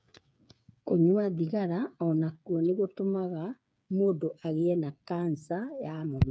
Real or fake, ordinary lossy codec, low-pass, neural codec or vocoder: fake; none; none; codec, 16 kHz, 8 kbps, FreqCodec, smaller model